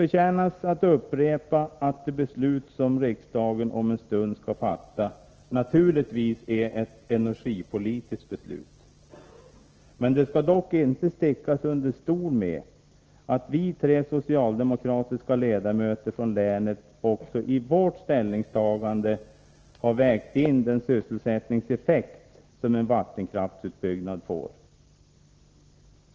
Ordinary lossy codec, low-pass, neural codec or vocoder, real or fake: Opus, 16 kbps; 7.2 kHz; none; real